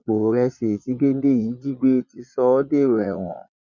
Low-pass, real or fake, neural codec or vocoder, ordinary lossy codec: 7.2 kHz; fake; vocoder, 22.05 kHz, 80 mel bands, Vocos; none